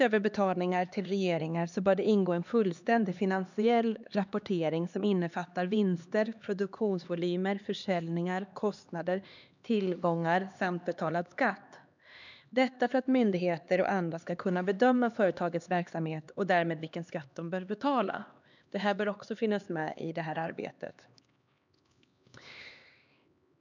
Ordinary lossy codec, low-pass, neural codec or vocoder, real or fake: none; 7.2 kHz; codec, 16 kHz, 2 kbps, X-Codec, HuBERT features, trained on LibriSpeech; fake